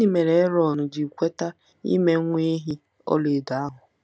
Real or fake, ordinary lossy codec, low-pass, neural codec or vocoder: real; none; none; none